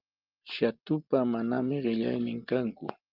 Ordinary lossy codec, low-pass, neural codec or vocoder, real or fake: Opus, 24 kbps; 5.4 kHz; none; real